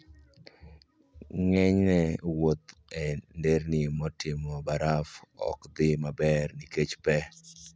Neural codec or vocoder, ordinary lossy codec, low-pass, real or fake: none; none; none; real